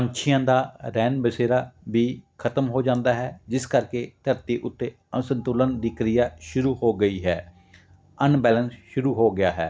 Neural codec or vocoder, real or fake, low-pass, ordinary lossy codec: none; real; none; none